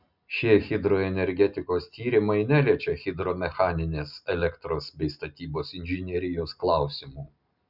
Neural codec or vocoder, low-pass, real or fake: none; 5.4 kHz; real